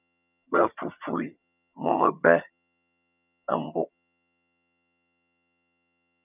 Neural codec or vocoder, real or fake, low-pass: vocoder, 22.05 kHz, 80 mel bands, HiFi-GAN; fake; 3.6 kHz